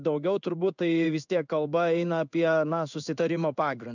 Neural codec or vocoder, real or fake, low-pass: codec, 16 kHz in and 24 kHz out, 1 kbps, XY-Tokenizer; fake; 7.2 kHz